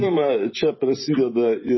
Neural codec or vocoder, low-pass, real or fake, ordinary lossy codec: vocoder, 24 kHz, 100 mel bands, Vocos; 7.2 kHz; fake; MP3, 24 kbps